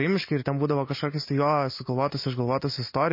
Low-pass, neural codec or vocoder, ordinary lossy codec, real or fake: 5.4 kHz; none; MP3, 24 kbps; real